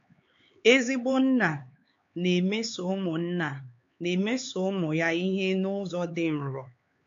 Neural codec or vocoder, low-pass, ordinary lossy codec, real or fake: codec, 16 kHz, 4 kbps, X-Codec, HuBERT features, trained on LibriSpeech; 7.2 kHz; AAC, 48 kbps; fake